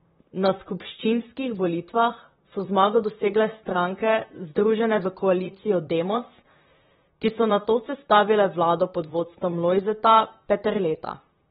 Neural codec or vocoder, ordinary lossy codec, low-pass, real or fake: vocoder, 44.1 kHz, 128 mel bands, Pupu-Vocoder; AAC, 16 kbps; 19.8 kHz; fake